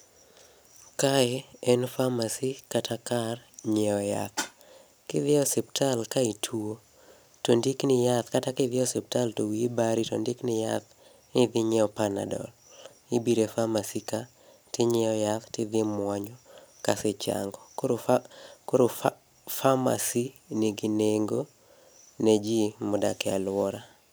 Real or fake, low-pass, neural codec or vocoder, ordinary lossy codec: real; none; none; none